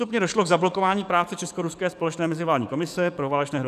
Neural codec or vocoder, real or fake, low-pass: codec, 44.1 kHz, 7.8 kbps, DAC; fake; 14.4 kHz